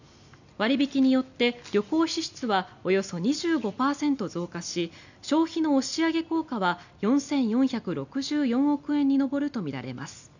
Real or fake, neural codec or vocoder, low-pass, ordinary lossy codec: real; none; 7.2 kHz; none